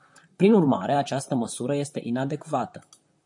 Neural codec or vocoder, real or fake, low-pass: vocoder, 44.1 kHz, 128 mel bands, Pupu-Vocoder; fake; 10.8 kHz